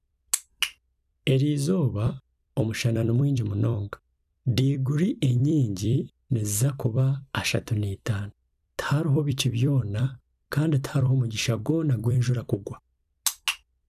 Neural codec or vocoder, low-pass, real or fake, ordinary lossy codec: vocoder, 44.1 kHz, 128 mel bands every 256 samples, BigVGAN v2; 14.4 kHz; fake; none